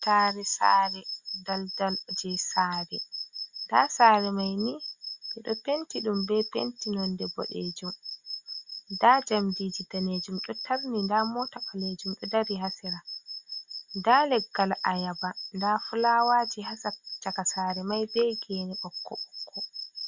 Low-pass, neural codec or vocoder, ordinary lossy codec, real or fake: 7.2 kHz; none; Opus, 64 kbps; real